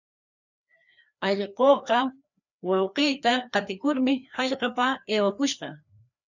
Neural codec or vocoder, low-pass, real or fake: codec, 16 kHz, 2 kbps, FreqCodec, larger model; 7.2 kHz; fake